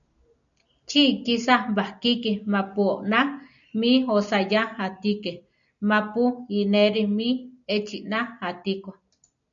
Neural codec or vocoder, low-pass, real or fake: none; 7.2 kHz; real